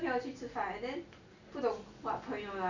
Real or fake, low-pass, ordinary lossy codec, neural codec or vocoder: real; 7.2 kHz; none; none